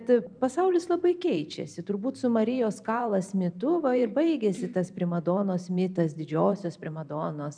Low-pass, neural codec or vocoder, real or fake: 9.9 kHz; vocoder, 44.1 kHz, 128 mel bands every 512 samples, BigVGAN v2; fake